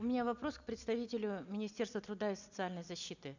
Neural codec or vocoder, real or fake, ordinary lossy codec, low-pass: none; real; none; 7.2 kHz